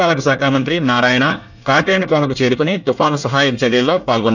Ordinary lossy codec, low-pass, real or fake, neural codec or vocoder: none; 7.2 kHz; fake; codec, 24 kHz, 1 kbps, SNAC